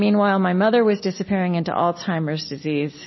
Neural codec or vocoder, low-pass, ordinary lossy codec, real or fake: none; 7.2 kHz; MP3, 24 kbps; real